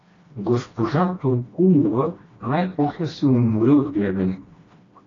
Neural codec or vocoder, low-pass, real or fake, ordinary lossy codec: codec, 16 kHz, 1 kbps, FreqCodec, smaller model; 7.2 kHz; fake; AAC, 32 kbps